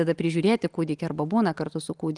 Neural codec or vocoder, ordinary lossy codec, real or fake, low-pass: none; Opus, 24 kbps; real; 10.8 kHz